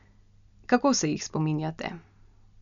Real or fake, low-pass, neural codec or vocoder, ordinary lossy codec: real; 7.2 kHz; none; none